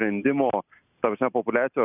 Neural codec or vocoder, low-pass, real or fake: none; 3.6 kHz; real